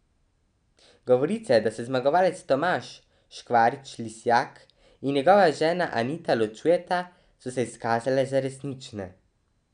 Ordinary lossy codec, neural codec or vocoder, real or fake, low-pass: none; none; real; 9.9 kHz